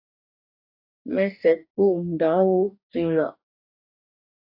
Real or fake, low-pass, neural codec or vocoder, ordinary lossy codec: fake; 5.4 kHz; codec, 44.1 kHz, 2.6 kbps, DAC; AAC, 48 kbps